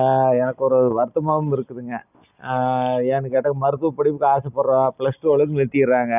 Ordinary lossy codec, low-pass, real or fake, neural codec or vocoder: none; 3.6 kHz; real; none